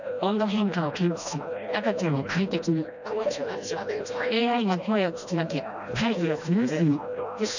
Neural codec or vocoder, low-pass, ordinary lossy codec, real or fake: codec, 16 kHz, 1 kbps, FreqCodec, smaller model; 7.2 kHz; none; fake